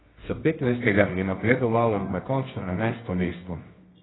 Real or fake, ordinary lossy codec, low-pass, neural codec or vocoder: fake; AAC, 16 kbps; 7.2 kHz; codec, 24 kHz, 0.9 kbps, WavTokenizer, medium music audio release